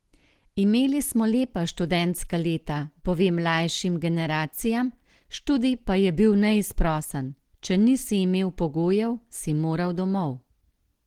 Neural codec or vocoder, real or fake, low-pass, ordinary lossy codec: none; real; 19.8 kHz; Opus, 16 kbps